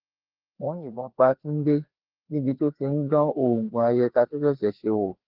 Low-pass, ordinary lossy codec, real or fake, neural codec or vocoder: 5.4 kHz; Opus, 16 kbps; fake; codec, 16 kHz, 2 kbps, FreqCodec, larger model